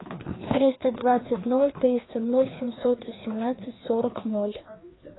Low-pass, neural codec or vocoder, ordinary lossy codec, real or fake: 7.2 kHz; codec, 16 kHz, 2 kbps, FreqCodec, larger model; AAC, 16 kbps; fake